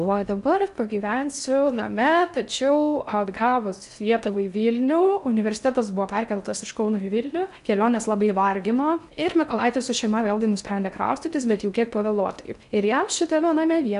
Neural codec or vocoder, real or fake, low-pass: codec, 16 kHz in and 24 kHz out, 0.6 kbps, FocalCodec, streaming, 4096 codes; fake; 10.8 kHz